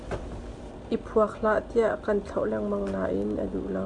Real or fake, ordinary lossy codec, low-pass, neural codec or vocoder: real; MP3, 64 kbps; 10.8 kHz; none